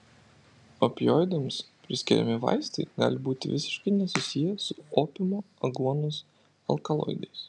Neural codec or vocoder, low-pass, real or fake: none; 10.8 kHz; real